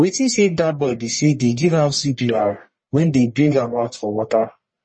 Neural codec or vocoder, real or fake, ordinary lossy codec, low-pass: codec, 44.1 kHz, 1.7 kbps, Pupu-Codec; fake; MP3, 32 kbps; 9.9 kHz